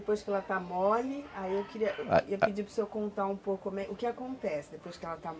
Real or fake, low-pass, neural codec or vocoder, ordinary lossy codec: real; none; none; none